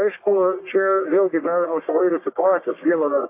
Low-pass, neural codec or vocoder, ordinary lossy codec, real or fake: 3.6 kHz; codec, 44.1 kHz, 1.7 kbps, Pupu-Codec; AAC, 24 kbps; fake